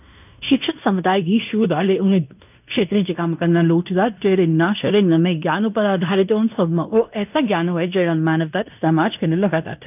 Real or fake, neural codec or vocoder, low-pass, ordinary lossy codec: fake; codec, 16 kHz in and 24 kHz out, 0.9 kbps, LongCat-Audio-Codec, fine tuned four codebook decoder; 3.6 kHz; none